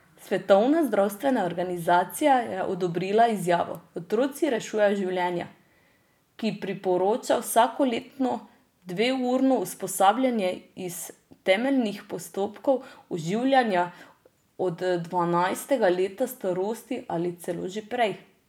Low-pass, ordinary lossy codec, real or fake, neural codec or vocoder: 19.8 kHz; none; real; none